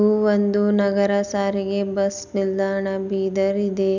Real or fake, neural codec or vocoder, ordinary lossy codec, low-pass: real; none; none; 7.2 kHz